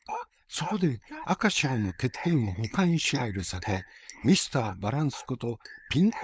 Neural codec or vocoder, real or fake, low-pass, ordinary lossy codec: codec, 16 kHz, 4.8 kbps, FACodec; fake; none; none